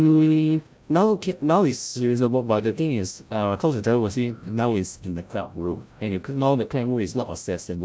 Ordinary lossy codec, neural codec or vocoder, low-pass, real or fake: none; codec, 16 kHz, 0.5 kbps, FreqCodec, larger model; none; fake